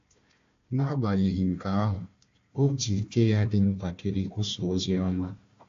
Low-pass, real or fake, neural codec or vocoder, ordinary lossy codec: 7.2 kHz; fake; codec, 16 kHz, 1 kbps, FunCodec, trained on Chinese and English, 50 frames a second; AAC, 48 kbps